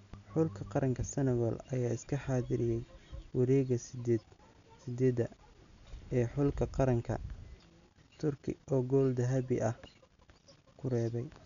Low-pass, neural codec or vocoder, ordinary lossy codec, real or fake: 7.2 kHz; none; none; real